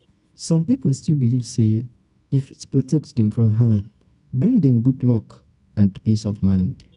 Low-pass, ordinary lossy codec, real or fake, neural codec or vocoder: 10.8 kHz; none; fake; codec, 24 kHz, 0.9 kbps, WavTokenizer, medium music audio release